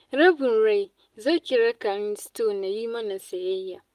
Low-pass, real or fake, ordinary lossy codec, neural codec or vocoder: 14.4 kHz; real; Opus, 32 kbps; none